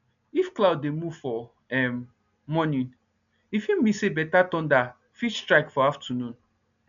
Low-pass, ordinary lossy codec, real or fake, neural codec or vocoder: 7.2 kHz; none; real; none